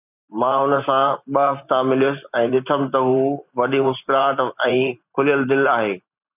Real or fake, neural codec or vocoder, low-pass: fake; vocoder, 44.1 kHz, 128 mel bands every 512 samples, BigVGAN v2; 3.6 kHz